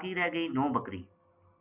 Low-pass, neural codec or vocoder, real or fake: 3.6 kHz; none; real